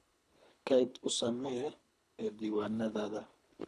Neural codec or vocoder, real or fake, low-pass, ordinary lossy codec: codec, 24 kHz, 3 kbps, HILCodec; fake; none; none